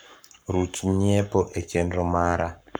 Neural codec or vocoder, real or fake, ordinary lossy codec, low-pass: codec, 44.1 kHz, 7.8 kbps, Pupu-Codec; fake; none; none